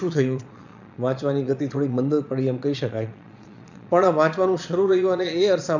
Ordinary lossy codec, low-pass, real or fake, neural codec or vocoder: none; 7.2 kHz; fake; vocoder, 22.05 kHz, 80 mel bands, Vocos